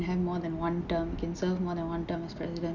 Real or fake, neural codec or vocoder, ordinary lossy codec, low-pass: real; none; none; 7.2 kHz